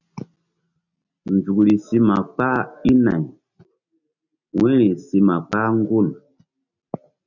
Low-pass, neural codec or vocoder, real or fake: 7.2 kHz; none; real